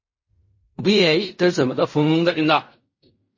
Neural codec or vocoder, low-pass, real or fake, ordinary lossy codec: codec, 16 kHz in and 24 kHz out, 0.4 kbps, LongCat-Audio-Codec, fine tuned four codebook decoder; 7.2 kHz; fake; MP3, 32 kbps